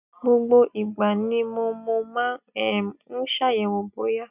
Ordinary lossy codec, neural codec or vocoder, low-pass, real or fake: none; none; 3.6 kHz; real